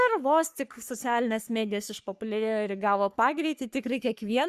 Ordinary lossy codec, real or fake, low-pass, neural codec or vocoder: Opus, 64 kbps; fake; 14.4 kHz; codec, 44.1 kHz, 3.4 kbps, Pupu-Codec